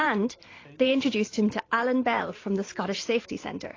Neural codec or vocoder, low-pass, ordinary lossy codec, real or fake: vocoder, 44.1 kHz, 128 mel bands every 512 samples, BigVGAN v2; 7.2 kHz; AAC, 32 kbps; fake